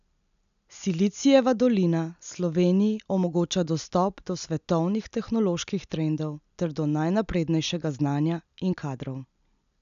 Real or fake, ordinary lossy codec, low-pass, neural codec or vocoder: real; none; 7.2 kHz; none